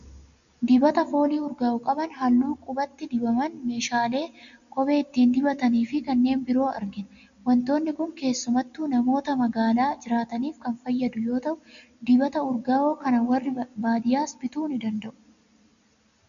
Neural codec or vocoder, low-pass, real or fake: none; 7.2 kHz; real